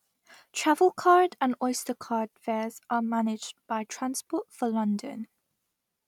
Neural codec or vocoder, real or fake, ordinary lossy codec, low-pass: none; real; none; 19.8 kHz